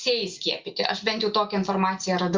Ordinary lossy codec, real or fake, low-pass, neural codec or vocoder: Opus, 24 kbps; real; 7.2 kHz; none